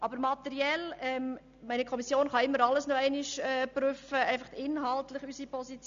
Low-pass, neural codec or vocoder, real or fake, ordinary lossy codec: 7.2 kHz; none; real; MP3, 64 kbps